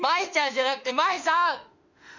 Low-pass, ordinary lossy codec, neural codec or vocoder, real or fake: 7.2 kHz; none; autoencoder, 48 kHz, 32 numbers a frame, DAC-VAE, trained on Japanese speech; fake